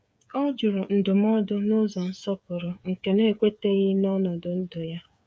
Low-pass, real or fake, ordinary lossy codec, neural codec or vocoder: none; fake; none; codec, 16 kHz, 8 kbps, FreqCodec, smaller model